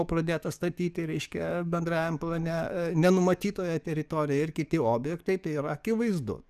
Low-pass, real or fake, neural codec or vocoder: 14.4 kHz; fake; codec, 44.1 kHz, 7.8 kbps, DAC